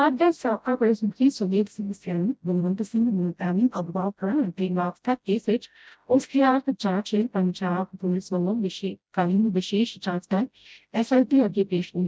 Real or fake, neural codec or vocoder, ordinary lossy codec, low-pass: fake; codec, 16 kHz, 0.5 kbps, FreqCodec, smaller model; none; none